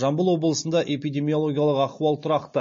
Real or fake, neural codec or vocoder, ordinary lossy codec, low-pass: real; none; MP3, 32 kbps; 7.2 kHz